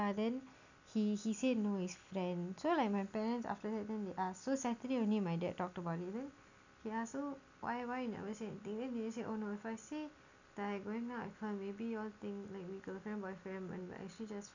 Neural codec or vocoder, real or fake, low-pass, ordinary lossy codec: none; real; 7.2 kHz; none